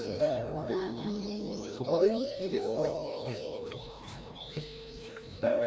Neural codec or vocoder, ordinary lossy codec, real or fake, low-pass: codec, 16 kHz, 1 kbps, FreqCodec, larger model; none; fake; none